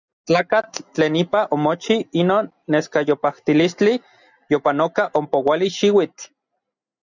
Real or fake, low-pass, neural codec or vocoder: real; 7.2 kHz; none